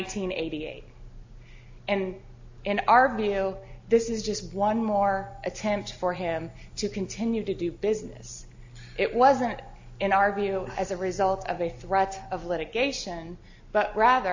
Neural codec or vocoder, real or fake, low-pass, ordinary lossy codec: none; real; 7.2 kHz; AAC, 48 kbps